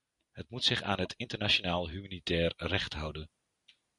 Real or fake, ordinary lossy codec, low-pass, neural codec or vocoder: real; Opus, 64 kbps; 10.8 kHz; none